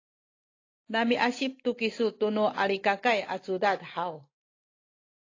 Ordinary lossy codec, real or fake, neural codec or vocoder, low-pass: AAC, 32 kbps; real; none; 7.2 kHz